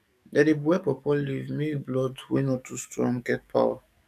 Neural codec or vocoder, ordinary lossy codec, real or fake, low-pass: codec, 44.1 kHz, 7.8 kbps, DAC; none; fake; 14.4 kHz